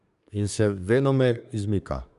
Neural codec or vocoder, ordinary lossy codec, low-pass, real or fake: codec, 24 kHz, 1 kbps, SNAC; none; 10.8 kHz; fake